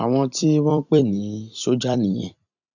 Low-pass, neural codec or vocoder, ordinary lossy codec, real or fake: 7.2 kHz; vocoder, 44.1 kHz, 128 mel bands every 256 samples, BigVGAN v2; none; fake